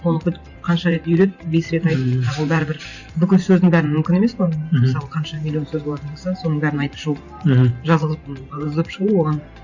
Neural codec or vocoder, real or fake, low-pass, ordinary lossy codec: vocoder, 44.1 kHz, 128 mel bands every 512 samples, BigVGAN v2; fake; 7.2 kHz; none